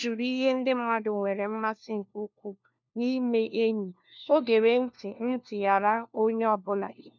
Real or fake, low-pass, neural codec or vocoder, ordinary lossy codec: fake; 7.2 kHz; codec, 16 kHz, 1 kbps, FunCodec, trained on LibriTTS, 50 frames a second; none